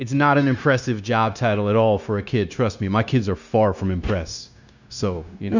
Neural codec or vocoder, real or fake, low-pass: codec, 16 kHz, 0.9 kbps, LongCat-Audio-Codec; fake; 7.2 kHz